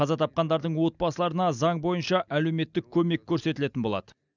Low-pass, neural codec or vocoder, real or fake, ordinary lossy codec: 7.2 kHz; none; real; none